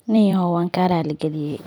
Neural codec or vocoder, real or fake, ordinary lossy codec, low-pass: vocoder, 44.1 kHz, 128 mel bands every 256 samples, BigVGAN v2; fake; none; 19.8 kHz